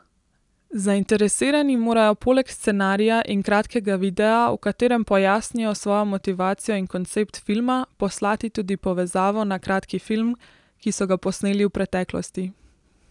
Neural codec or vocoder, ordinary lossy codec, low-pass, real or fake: none; none; 10.8 kHz; real